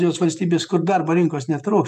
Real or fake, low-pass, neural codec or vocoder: fake; 14.4 kHz; vocoder, 48 kHz, 128 mel bands, Vocos